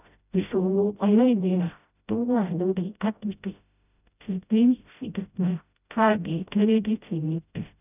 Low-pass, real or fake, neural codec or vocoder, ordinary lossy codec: 3.6 kHz; fake; codec, 16 kHz, 0.5 kbps, FreqCodec, smaller model; none